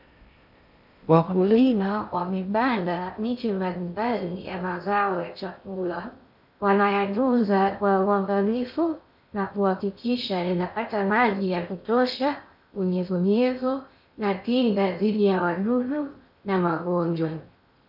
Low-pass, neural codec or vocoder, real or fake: 5.4 kHz; codec, 16 kHz in and 24 kHz out, 0.6 kbps, FocalCodec, streaming, 2048 codes; fake